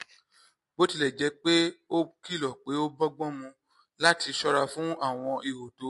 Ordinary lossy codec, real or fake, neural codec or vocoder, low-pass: MP3, 48 kbps; real; none; 14.4 kHz